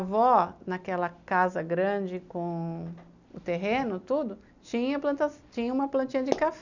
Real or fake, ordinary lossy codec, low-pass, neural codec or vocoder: real; none; 7.2 kHz; none